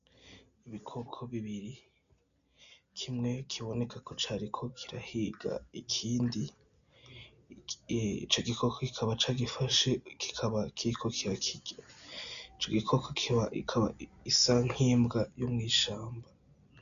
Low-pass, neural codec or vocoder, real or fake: 7.2 kHz; none; real